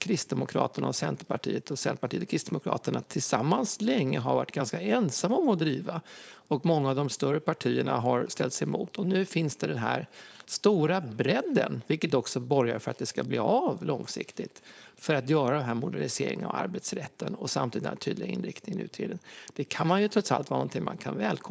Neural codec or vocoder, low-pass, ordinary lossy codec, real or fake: codec, 16 kHz, 4.8 kbps, FACodec; none; none; fake